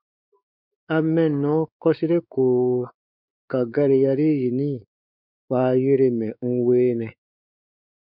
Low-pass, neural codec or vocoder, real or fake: 5.4 kHz; codec, 16 kHz, 4 kbps, X-Codec, WavLM features, trained on Multilingual LibriSpeech; fake